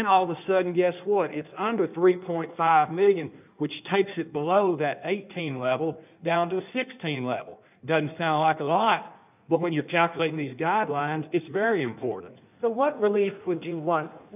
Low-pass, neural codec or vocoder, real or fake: 3.6 kHz; codec, 16 kHz in and 24 kHz out, 1.1 kbps, FireRedTTS-2 codec; fake